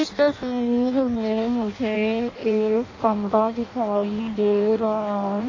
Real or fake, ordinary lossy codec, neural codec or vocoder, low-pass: fake; AAC, 32 kbps; codec, 16 kHz in and 24 kHz out, 0.6 kbps, FireRedTTS-2 codec; 7.2 kHz